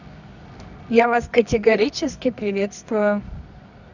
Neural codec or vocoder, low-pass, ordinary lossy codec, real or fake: codec, 24 kHz, 0.9 kbps, WavTokenizer, medium music audio release; 7.2 kHz; none; fake